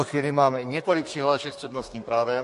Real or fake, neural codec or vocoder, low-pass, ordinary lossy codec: fake; codec, 32 kHz, 1.9 kbps, SNAC; 14.4 kHz; MP3, 48 kbps